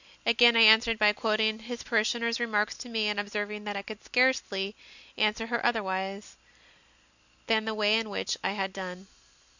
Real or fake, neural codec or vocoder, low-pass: real; none; 7.2 kHz